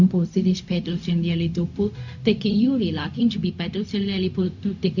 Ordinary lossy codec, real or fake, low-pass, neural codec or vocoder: none; fake; 7.2 kHz; codec, 16 kHz, 0.4 kbps, LongCat-Audio-Codec